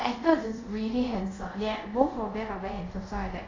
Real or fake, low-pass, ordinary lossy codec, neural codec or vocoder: fake; 7.2 kHz; AAC, 32 kbps; codec, 24 kHz, 0.5 kbps, DualCodec